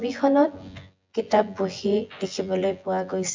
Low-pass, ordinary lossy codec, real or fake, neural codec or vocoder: 7.2 kHz; MP3, 64 kbps; fake; vocoder, 24 kHz, 100 mel bands, Vocos